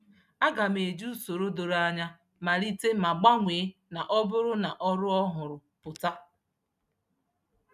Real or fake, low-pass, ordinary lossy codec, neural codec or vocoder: real; 14.4 kHz; none; none